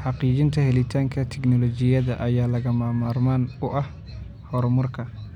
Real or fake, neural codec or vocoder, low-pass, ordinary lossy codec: real; none; 19.8 kHz; none